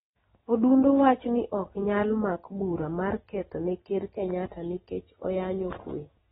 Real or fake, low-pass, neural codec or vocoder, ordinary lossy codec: real; 19.8 kHz; none; AAC, 16 kbps